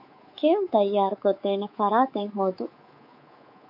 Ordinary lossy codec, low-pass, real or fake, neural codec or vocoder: AAC, 48 kbps; 5.4 kHz; fake; codec, 24 kHz, 3.1 kbps, DualCodec